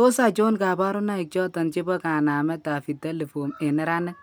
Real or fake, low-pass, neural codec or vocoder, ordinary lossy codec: real; none; none; none